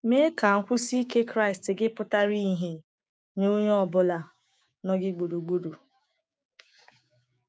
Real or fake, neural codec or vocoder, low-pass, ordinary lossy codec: fake; codec, 16 kHz, 6 kbps, DAC; none; none